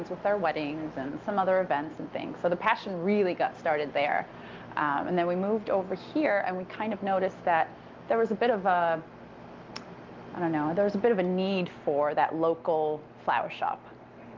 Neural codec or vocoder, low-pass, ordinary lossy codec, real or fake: none; 7.2 kHz; Opus, 24 kbps; real